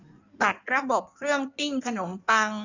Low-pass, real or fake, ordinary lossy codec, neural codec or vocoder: 7.2 kHz; fake; none; codec, 16 kHz in and 24 kHz out, 1.1 kbps, FireRedTTS-2 codec